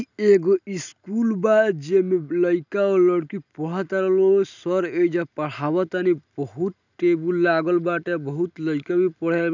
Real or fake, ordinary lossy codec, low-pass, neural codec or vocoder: real; none; 7.2 kHz; none